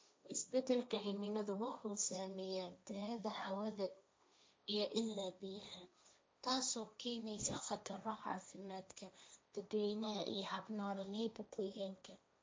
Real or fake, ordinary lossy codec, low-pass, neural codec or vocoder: fake; none; none; codec, 16 kHz, 1.1 kbps, Voila-Tokenizer